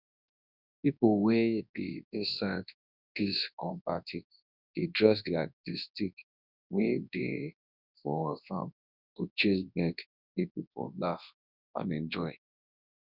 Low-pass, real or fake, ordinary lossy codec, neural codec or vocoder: 5.4 kHz; fake; none; codec, 24 kHz, 0.9 kbps, WavTokenizer, large speech release